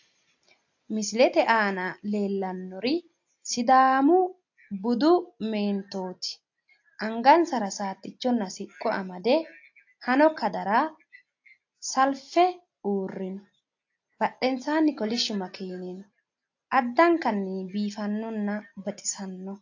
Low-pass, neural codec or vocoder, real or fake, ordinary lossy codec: 7.2 kHz; none; real; AAC, 48 kbps